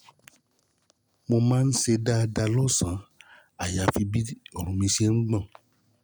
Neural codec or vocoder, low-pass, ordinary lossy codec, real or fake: none; none; none; real